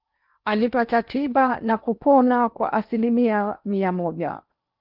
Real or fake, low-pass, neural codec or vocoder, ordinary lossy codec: fake; 5.4 kHz; codec, 16 kHz in and 24 kHz out, 0.8 kbps, FocalCodec, streaming, 65536 codes; Opus, 24 kbps